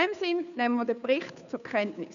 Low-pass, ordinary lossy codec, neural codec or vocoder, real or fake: 7.2 kHz; none; codec, 16 kHz, 2 kbps, FunCodec, trained on Chinese and English, 25 frames a second; fake